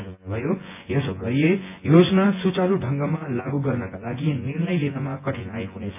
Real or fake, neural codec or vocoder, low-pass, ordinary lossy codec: fake; vocoder, 24 kHz, 100 mel bands, Vocos; 3.6 kHz; MP3, 24 kbps